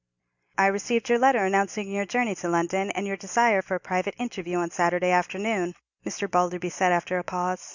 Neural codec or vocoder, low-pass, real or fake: none; 7.2 kHz; real